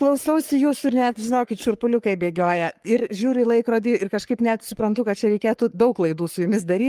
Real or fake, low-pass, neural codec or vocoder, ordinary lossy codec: fake; 14.4 kHz; codec, 44.1 kHz, 3.4 kbps, Pupu-Codec; Opus, 32 kbps